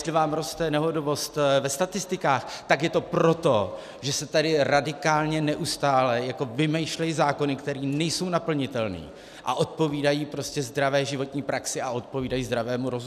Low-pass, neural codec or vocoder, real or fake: 14.4 kHz; none; real